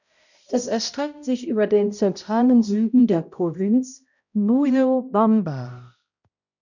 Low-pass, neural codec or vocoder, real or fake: 7.2 kHz; codec, 16 kHz, 0.5 kbps, X-Codec, HuBERT features, trained on balanced general audio; fake